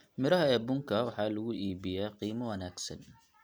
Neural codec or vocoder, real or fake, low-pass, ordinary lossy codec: none; real; none; none